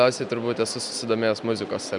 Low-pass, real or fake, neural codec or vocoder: 10.8 kHz; real; none